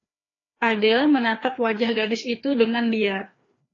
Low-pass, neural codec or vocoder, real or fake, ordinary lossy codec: 7.2 kHz; codec, 16 kHz, 2 kbps, FreqCodec, larger model; fake; AAC, 32 kbps